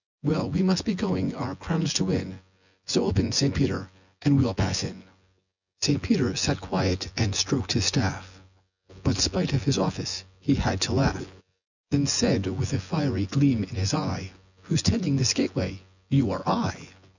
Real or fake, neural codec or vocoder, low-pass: fake; vocoder, 24 kHz, 100 mel bands, Vocos; 7.2 kHz